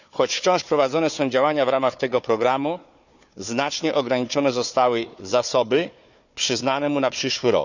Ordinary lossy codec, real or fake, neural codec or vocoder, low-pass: none; fake; codec, 16 kHz, 4 kbps, FunCodec, trained on Chinese and English, 50 frames a second; 7.2 kHz